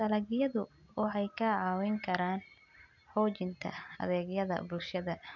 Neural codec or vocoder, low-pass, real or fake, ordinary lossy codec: none; 7.2 kHz; real; none